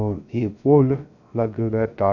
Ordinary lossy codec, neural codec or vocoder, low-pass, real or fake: none; codec, 16 kHz, 0.3 kbps, FocalCodec; 7.2 kHz; fake